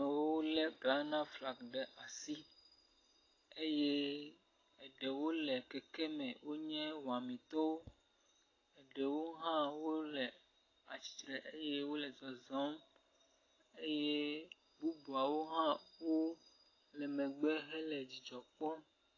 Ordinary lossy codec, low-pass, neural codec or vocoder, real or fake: AAC, 32 kbps; 7.2 kHz; none; real